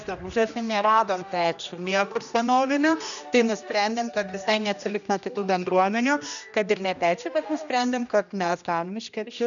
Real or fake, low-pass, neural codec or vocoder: fake; 7.2 kHz; codec, 16 kHz, 1 kbps, X-Codec, HuBERT features, trained on general audio